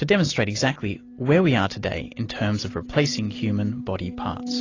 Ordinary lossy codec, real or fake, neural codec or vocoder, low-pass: AAC, 32 kbps; real; none; 7.2 kHz